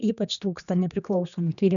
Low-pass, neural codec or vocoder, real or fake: 7.2 kHz; codec, 16 kHz, 2 kbps, X-Codec, HuBERT features, trained on general audio; fake